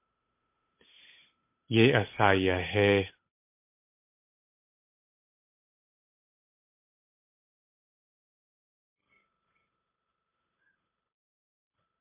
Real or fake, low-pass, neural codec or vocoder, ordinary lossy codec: fake; 3.6 kHz; codec, 16 kHz, 8 kbps, FunCodec, trained on Chinese and English, 25 frames a second; MP3, 24 kbps